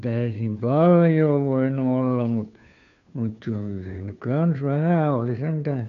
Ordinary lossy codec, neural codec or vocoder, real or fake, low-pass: none; codec, 16 kHz, 2 kbps, FunCodec, trained on Chinese and English, 25 frames a second; fake; 7.2 kHz